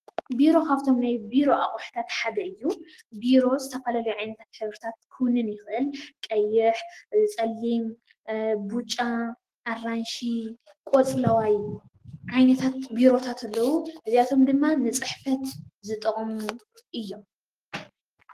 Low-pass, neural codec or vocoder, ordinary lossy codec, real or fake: 14.4 kHz; autoencoder, 48 kHz, 128 numbers a frame, DAC-VAE, trained on Japanese speech; Opus, 16 kbps; fake